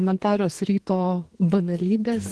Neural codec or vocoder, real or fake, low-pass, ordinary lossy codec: codec, 44.1 kHz, 2.6 kbps, SNAC; fake; 10.8 kHz; Opus, 16 kbps